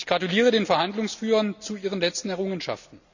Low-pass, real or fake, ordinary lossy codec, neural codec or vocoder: 7.2 kHz; real; none; none